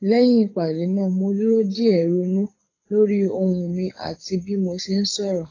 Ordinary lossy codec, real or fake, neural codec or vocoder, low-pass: AAC, 32 kbps; fake; codec, 24 kHz, 6 kbps, HILCodec; 7.2 kHz